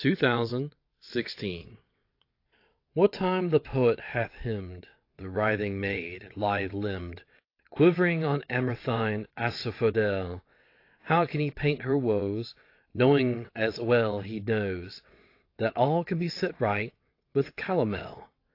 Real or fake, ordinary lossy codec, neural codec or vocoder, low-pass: fake; AAC, 32 kbps; vocoder, 22.05 kHz, 80 mel bands, WaveNeXt; 5.4 kHz